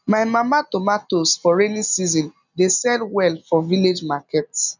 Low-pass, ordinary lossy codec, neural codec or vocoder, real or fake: 7.2 kHz; none; none; real